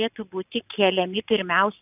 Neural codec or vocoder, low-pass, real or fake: none; 3.6 kHz; real